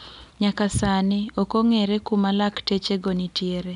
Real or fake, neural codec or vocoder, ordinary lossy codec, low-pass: real; none; none; 10.8 kHz